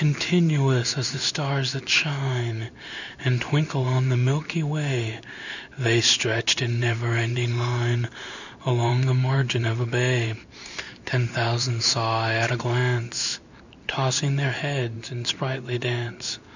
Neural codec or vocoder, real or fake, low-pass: codec, 16 kHz in and 24 kHz out, 1 kbps, XY-Tokenizer; fake; 7.2 kHz